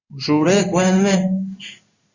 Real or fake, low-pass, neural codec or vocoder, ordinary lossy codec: fake; 7.2 kHz; codec, 16 kHz in and 24 kHz out, 1 kbps, XY-Tokenizer; Opus, 64 kbps